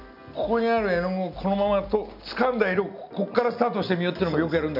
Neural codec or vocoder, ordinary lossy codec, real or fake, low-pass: none; none; real; 5.4 kHz